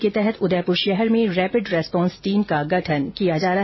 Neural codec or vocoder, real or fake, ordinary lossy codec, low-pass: none; real; MP3, 24 kbps; 7.2 kHz